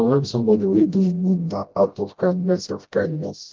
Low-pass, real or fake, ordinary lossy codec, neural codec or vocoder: 7.2 kHz; fake; Opus, 32 kbps; codec, 16 kHz, 0.5 kbps, FreqCodec, smaller model